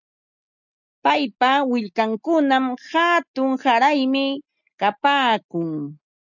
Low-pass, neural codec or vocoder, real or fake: 7.2 kHz; none; real